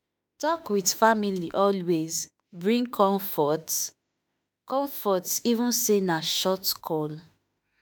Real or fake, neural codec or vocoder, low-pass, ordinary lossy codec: fake; autoencoder, 48 kHz, 32 numbers a frame, DAC-VAE, trained on Japanese speech; none; none